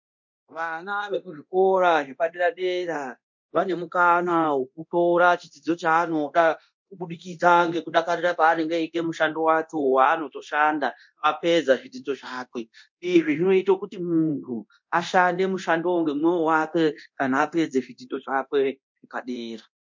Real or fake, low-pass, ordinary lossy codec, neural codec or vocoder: fake; 7.2 kHz; MP3, 48 kbps; codec, 24 kHz, 0.9 kbps, DualCodec